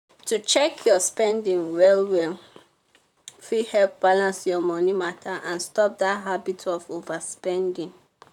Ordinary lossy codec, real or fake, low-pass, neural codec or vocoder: none; fake; 19.8 kHz; vocoder, 44.1 kHz, 128 mel bands, Pupu-Vocoder